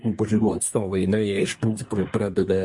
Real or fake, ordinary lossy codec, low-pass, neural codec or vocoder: fake; MP3, 48 kbps; 10.8 kHz; codec, 24 kHz, 1 kbps, SNAC